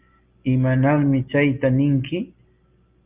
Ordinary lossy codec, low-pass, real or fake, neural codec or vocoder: Opus, 24 kbps; 3.6 kHz; real; none